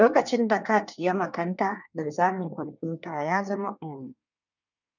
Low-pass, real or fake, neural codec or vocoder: 7.2 kHz; fake; codec, 24 kHz, 1 kbps, SNAC